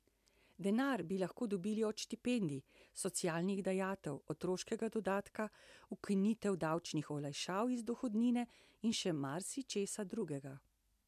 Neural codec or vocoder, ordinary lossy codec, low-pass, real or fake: none; MP3, 96 kbps; 14.4 kHz; real